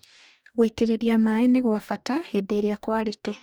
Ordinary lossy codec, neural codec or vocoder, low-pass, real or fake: none; codec, 44.1 kHz, 2.6 kbps, DAC; none; fake